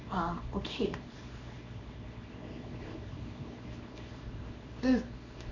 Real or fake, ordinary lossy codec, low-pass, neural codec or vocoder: fake; none; 7.2 kHz; codec, 24 kHz, 0.9 kbps, WavTokenizer, small release